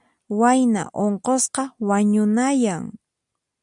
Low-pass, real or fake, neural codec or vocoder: 10.8 kHz; real; none